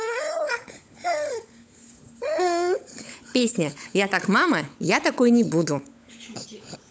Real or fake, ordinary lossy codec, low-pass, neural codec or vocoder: fake; none; none; codec, 16 kHz, 16 kbps, FunCodec, trained on LibriTTS, 50 frames a second